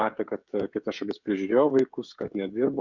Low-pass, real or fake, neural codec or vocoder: 7.2 kHz; fake; codec, 16 kHz, 16 kbps, FreqCodec, smaller model